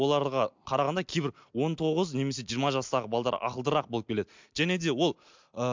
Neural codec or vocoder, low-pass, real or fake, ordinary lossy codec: none; 7.2 kHz; real; MP3, 64 kbps